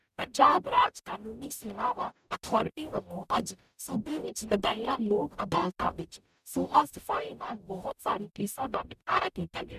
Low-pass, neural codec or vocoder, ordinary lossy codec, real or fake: 14.4 kHz; codec, 44.1 kHz, 0.9 kbps, DAC; none; fake